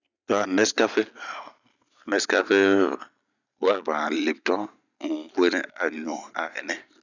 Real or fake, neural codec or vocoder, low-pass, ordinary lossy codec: real; none; 7.2 kHz; none